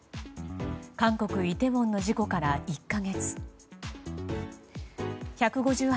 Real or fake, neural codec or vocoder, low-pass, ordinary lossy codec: real; none; none; none